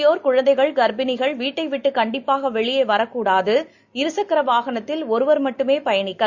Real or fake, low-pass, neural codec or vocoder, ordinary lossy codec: real; 7.2 kHz; none; Opus, 64 kbps